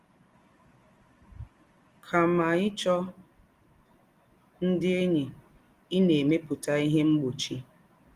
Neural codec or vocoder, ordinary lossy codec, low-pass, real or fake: none; Opus, 24 kbps; 14.4 kHz; real